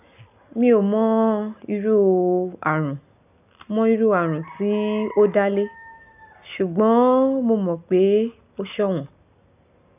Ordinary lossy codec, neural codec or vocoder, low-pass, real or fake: none; none; 3.6 kHz; real